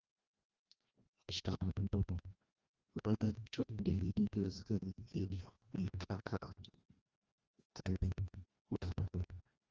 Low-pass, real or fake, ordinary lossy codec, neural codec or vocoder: 7.2 kHz; fake; Opus, 24 kbps; codec, 16 kHz, 1 kbps, FreqCodec, larger model